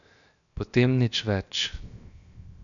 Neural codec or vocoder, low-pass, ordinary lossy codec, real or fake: codec, 16 kHz, 0.7 kbps, FocalCodec; 7.2 kHz; none; fake